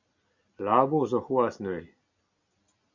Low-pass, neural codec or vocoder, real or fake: 7.2 kHz; none; real